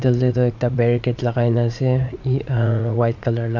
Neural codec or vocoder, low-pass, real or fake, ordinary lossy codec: vocoder, 44.1 kHz, 80 mel bands, Vocos; 7.2 kHz; fake; none